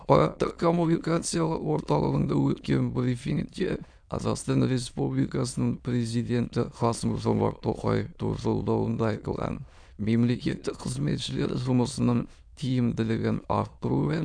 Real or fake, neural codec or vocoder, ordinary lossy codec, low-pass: fake; autoencoder, 22.05 kHz, a latent of 192 numbers a frame, VITS, trained on many speakers; none; 9.9 kHz